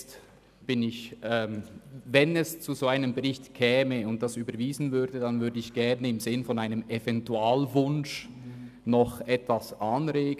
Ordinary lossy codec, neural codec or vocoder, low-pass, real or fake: none; none; 14.4 kHz; real